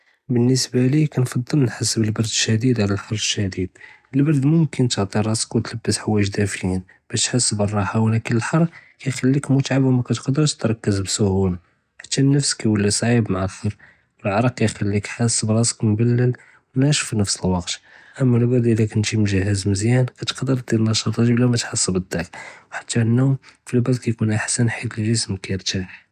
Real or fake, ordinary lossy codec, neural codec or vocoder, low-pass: real; none; none; 14.4 kHz